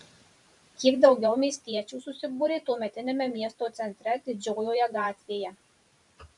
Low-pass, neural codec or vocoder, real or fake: 10.8 kHz; vocoder, 44.1 kHz, 128 mel bands every 512 samples, BigVGAN v2; fake